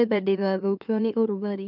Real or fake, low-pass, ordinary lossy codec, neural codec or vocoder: fake; 5.4 kHz; none; autoencoder, 44.1 kHz, a latent of 192 numbers a frame, MeloTTS